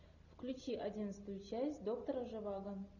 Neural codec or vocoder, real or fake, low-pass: none; real; 7.2 kHz